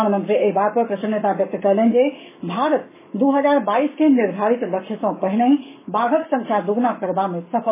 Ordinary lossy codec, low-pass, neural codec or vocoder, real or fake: MP3, 16 kbps; 3.6 kHz; codec, 44.1 kHz, 7.8 kbps, Pupu-Codec; fake